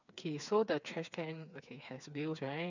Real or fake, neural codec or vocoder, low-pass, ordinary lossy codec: fake; codec, 16 kHz, 4 kbps, FreqCodec, smaller model; 7.2 kHz; none